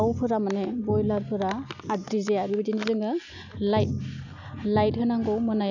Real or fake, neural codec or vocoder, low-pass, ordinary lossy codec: real; none; 7.2 kHz; none